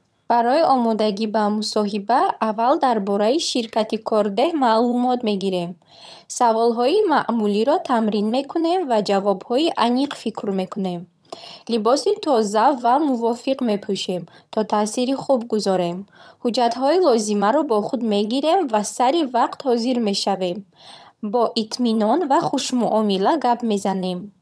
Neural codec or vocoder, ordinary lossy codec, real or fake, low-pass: vocoder, 22.05 kHz, 80 mel bands, HiFi-GAN; none; fake; none